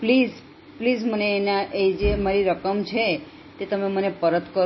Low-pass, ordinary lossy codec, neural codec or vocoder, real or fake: 7.2 kHz; MP3, 24 kbps; none; real